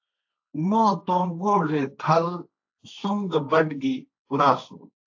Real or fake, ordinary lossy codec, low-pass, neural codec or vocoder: fake; AAC, 48 kbps; 7.2 kHz; codec, 16 kHz, 1.1 kbps, Voila-Tokenizer